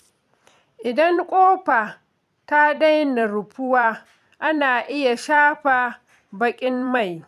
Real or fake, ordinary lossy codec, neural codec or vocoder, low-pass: fake; none; vocoder, 44.1 kHz, 128 mel bands every 256 samples, BigVGAN v2; 14.4 kHz